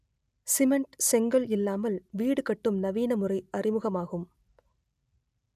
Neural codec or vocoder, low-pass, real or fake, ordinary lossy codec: none; 14.4 kHz; real; none